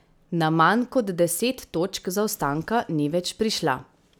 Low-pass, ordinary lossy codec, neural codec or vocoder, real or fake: none; none; none; real